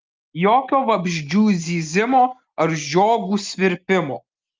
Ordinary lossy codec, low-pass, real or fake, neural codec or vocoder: Opus, 32 kbps; 7.2 kHz; real; none